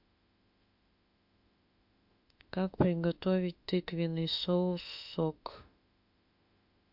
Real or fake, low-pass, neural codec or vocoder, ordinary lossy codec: fake; 5.4 kHz; autoencoder, 48 kHz, 32 numbers a frame, DAC-VAE, trained on Japanese speech; MP3, 48 kbps